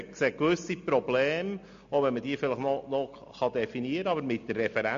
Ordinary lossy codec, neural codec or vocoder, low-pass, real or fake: MP3, 48 kbps; none; 7.2 kHz; real